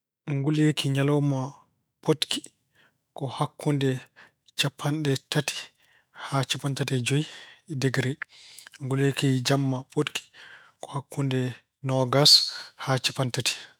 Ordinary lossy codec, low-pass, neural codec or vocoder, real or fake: none; none; autoencoder, 48 kHz, 128 numbers a frame, DAC-VAE, trained on Japanese speech; fake